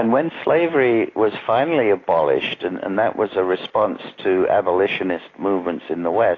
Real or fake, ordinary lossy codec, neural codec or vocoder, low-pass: real; AAC, 32 kbps; none; 7.2 kHz